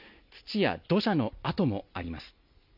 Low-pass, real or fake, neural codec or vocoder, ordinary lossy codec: 5.4 kHz; real; none; none